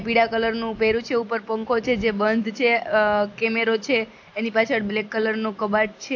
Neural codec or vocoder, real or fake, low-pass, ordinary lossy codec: none; real; 7.2 kHz; AAC, 48 kbps